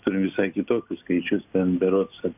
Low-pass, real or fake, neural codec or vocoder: 3.6 kHz; real; none